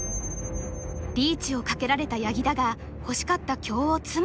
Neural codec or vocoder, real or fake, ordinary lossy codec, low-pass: none; real; none; none